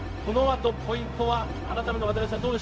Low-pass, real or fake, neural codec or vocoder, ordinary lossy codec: none; fake; codec, 16 kHz, 0.4 kbps, LongCat-Audio-Codec; none